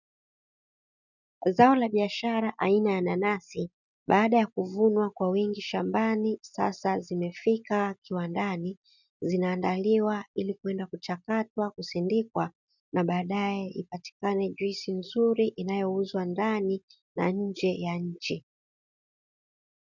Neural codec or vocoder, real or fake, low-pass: none; real; 7.2 kHz